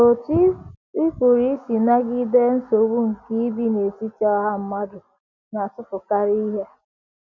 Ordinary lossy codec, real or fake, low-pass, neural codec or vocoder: none; real; 7.2 kHz; none